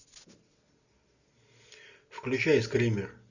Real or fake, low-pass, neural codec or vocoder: real; 7.2 kHz; none